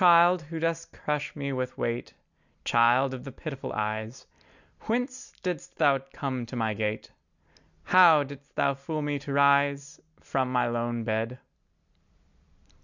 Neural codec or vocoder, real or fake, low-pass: none; real; 7.2 kHz